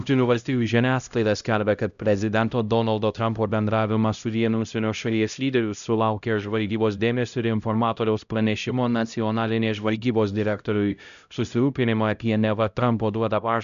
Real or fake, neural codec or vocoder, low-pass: fake; codec, 16 kHz, 0.5 kbps, X-Codec, HuBERT features, trained on LibriSpeech; 7.2 kHz